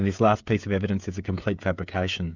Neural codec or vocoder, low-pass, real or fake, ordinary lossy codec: codec, 16 kHz, 16 kbps, FreqCodec, smaller model; 7.2 kHz; fake; Opus, 64 kbps